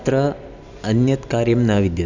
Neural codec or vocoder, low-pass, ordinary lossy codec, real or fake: none; 7.2 kHz; none; real